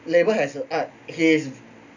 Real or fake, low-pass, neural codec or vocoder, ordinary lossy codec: real; 7.2 kHz; none; none